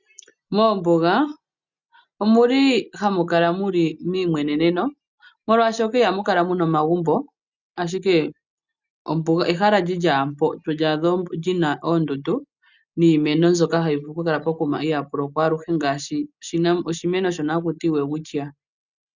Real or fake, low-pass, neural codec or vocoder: real; 7.2 kHz; none